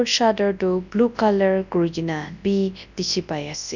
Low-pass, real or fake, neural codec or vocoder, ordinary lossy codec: 7.2 kHz; fake; codec, 24 kHz, 0.9 kbps, WavTokenizer, large speech release; none